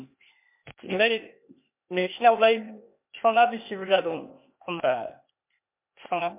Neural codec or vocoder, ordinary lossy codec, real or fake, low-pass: codec, 16 kHz, 0.8 kbps, ZipCodec; MP3, 32 kbps; fake; 3.6 kHz